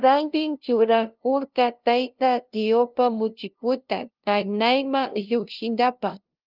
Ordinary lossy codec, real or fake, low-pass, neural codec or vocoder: Opus, 24 kbps; fake; 5.4 kHz; codec, 16 kHz, 0.5 kbps, FunCodec, trained on LibriTTS, 25 frames a second